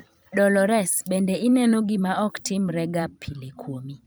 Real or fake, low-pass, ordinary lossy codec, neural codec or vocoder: fake; none; none; vocoder, 44.1 kHz, 128 mel bands every 256 samples, BigVGAN v2